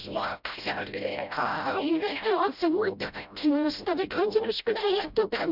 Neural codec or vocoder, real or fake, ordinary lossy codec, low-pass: codec, 16 kHz, 0.5 kbps, FreqCodec, smaller model; fake; none; 5.4 kHz